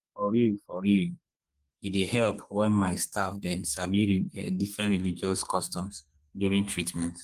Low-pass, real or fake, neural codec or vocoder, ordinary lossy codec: 14.4 kHz; fake; codec, 32 kHz, 1.9 kbps, SNAC; Opus, 32 kbps